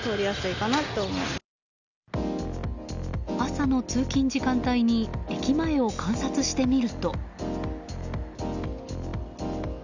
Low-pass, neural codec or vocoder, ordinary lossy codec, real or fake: 7.2 kHz; none; none; real